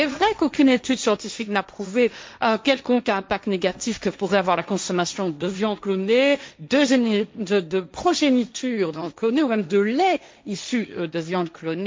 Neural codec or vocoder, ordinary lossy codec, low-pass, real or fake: codec, 16 kHz, 1.1 kbps, Voila-Tokenizer; none; none; fake